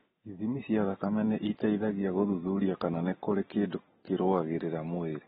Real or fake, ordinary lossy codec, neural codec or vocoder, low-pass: real; AAC, 16 kbps; none; 7.2 kHz